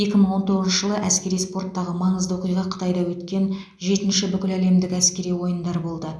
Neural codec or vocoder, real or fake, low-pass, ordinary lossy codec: none; real; none; none